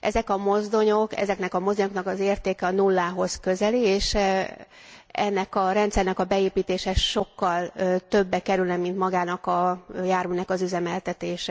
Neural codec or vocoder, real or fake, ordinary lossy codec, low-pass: none; real; none; none